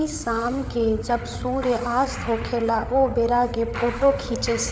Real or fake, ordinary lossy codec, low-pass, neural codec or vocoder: fake; none; none; codec, 16 kHz, 8 kbps, FreqCodec, larger model